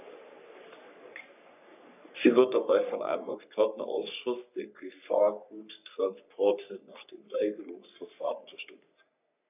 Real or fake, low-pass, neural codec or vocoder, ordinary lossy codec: fake; 3.6 kHz; codec, 44.1 kHz, 3.4 kbps, Pupu-Codec; none